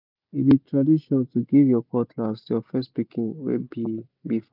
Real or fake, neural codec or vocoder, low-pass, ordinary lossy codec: real; none; 5.4 kHz; none